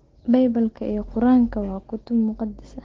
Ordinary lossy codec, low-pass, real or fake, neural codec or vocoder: Opus, 16 kbps; 7.2 kHz; real; none